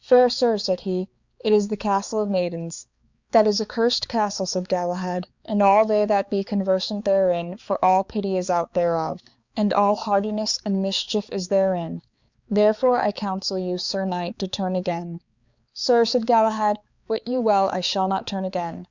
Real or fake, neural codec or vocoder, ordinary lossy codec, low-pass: fake; codec, 16 kHz, 4 kbps, X-Codec, HuBERT features, trained on balanced general audio; Opus, 64 kbps; 7.2 kHz